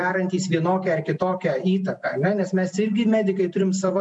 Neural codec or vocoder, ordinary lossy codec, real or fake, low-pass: none; MP3, 64 kbps; real; 10.8 kHz